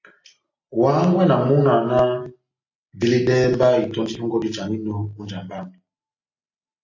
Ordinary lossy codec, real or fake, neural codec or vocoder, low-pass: AAC, 48 kbps; real; none; 7.2 kHz